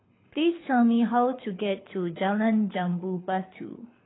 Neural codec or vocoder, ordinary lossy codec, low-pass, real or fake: codec, 24 kHz, 6 kbps, HILCodec; AAC, 16 kbps; 7.2 kHz; fake